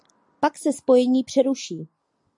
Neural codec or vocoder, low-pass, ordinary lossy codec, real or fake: none; 10.8 kHz; AAC, 64 kbps; real